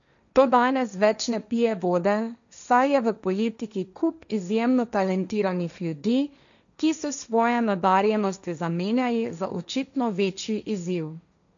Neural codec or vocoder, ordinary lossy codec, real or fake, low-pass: codec, 16 kHz, 1.1 kbps, Voila-Tokenizer; none; fake; 7.2 kHz